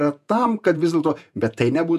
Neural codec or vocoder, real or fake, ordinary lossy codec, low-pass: vocoder, 48 kHz, 128 mel bands, Vocos; fake; MP3, 96 kbps; 14.4 kHz